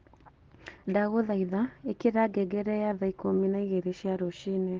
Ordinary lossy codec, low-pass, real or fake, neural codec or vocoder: Opus, 16 kbps; 7.2 kHz; real; none